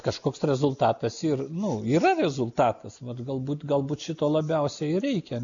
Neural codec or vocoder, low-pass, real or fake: none; 7.2 kHz; real